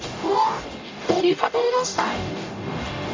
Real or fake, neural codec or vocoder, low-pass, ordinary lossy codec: fake; codec, 44.1 kHz, 0.9 kbps, DAC; 7.2 kHz; MP3, 64 kbps